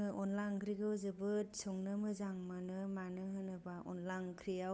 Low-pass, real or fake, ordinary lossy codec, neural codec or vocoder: none; fake; none; codec, 16 kHz, 8 kbps, FunCodec, trained on Chinese and English, 25 frames a second